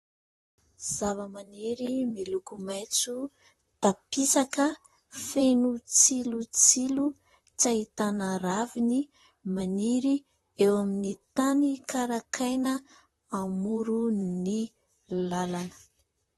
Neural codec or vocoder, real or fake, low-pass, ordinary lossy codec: vocoder, 44.1 kHz, 128 mel bands, Pupu-Vocoder; fake; 19.8 kHz; AAC, 32 kbps